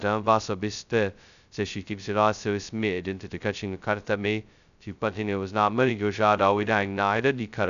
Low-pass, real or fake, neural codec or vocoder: 7.2 kHz; fake; codec, 16 kHz, 0.2 kbps, FocalCodec